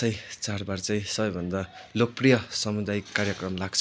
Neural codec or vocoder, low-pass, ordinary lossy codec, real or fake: none; none; none; real